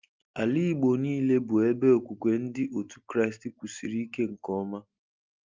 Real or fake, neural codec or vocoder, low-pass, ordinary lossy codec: real; none; 7.2 kHz; Opus, 24 kbps